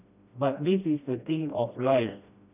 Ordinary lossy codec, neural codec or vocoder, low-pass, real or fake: none; codec, 16 kHz, 1 kbps, FreqCodec, smaller model; 3.6 kHz; fake